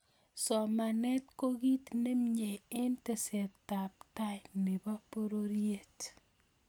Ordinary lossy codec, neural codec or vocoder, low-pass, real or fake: none; none; none; real